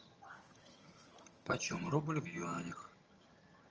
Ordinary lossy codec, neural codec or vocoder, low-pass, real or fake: Opus, 24 kbps; vocoder, 22.05 kHz, 80 mel bands, HiFi-GAN; 7.2 kHz; fake